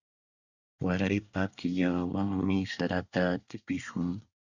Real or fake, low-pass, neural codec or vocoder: fake; 7.2 kHz; codec, 24 kHz, 1 kbps, SNAC